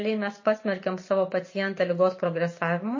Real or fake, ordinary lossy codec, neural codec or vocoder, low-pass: real; MP3, 32 kbps; none; 7.2 kHz